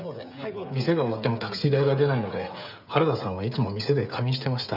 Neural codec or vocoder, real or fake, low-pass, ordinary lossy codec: codec, 16 kHz, 16 kbps, FreqCodec, smaller model; fake; 5.4 kHz; none